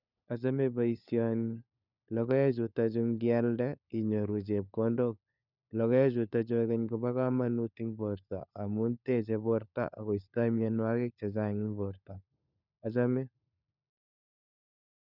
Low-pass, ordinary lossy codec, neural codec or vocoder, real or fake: 5.4 kHz; none; codec, 16 kHz, 4 kbps, FunCodec, trained on LibriTTS, 50 frames a second; fake